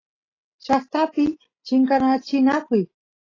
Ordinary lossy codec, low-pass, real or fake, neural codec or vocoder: AAC, 32 kbps; 7.2 kHz; real; none